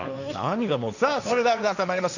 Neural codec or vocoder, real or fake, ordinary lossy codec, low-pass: codec, 16 kHz, 1.1 kbps, Voila-Tokenizer; fake; none; 7.2 kHz